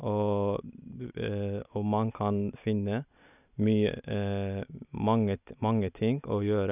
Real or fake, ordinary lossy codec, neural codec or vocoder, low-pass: real; none; none; 3.6 kHz